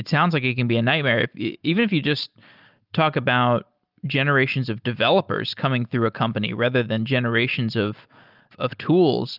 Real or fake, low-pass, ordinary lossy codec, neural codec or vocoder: real; 5.4 kHz; Opus, 24 kbps; none